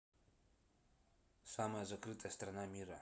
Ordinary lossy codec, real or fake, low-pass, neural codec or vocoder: none; real; none; none